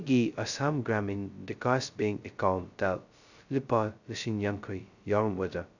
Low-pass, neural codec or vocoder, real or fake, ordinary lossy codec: 7.2 kHz; codec, 16 kHz, 0.2 kbps, FocalCodec; fake; none